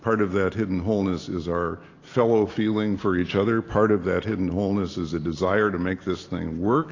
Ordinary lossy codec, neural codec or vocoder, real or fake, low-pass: AAC, 32 kbps; none; real; 7.2 kHz